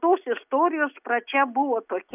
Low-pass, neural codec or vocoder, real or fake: 3.6 kHz; none; real